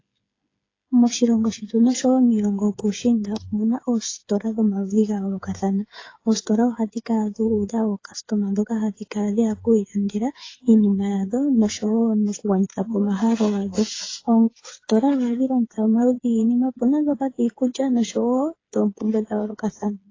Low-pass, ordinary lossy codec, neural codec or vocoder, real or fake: 7.2 kHz; AAC, 32 kbps; codec, 16 kHz, 8 kbps, FreqCodec, smaller model; fake